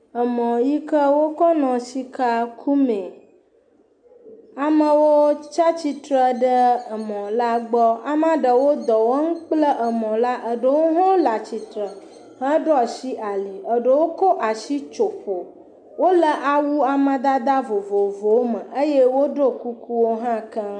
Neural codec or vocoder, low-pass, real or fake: none; 9.9 kHz; real